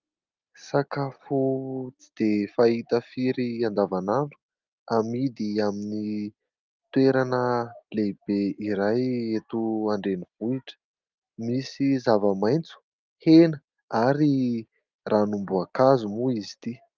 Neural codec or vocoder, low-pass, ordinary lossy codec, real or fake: none; 7.2 kHz; Opus, 24 kbps; real